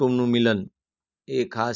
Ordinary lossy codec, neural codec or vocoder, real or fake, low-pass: none; none; real; 7.2 kHz